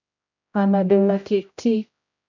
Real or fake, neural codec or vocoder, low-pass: fake; codec, 16 kHz, 0.5 kbps, X-Codec, HuBERT features, trained on general audio; 7.2 kHz